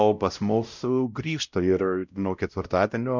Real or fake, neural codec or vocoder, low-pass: fake; codec, 16 kHz, 0.5 kbps, X-Codec, WavLM features, trained on Multilingual LibriSpeech; 7.2 kHz